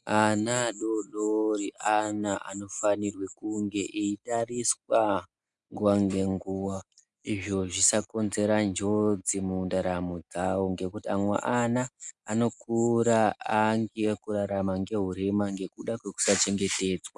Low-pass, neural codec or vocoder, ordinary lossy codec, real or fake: 10.8 kHz; none; MP3, 96 kbps; real